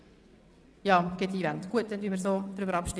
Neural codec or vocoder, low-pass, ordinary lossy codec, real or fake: vocoder, 22.05 kHz, 80 mel bands, WaveNeXt; none; none; fake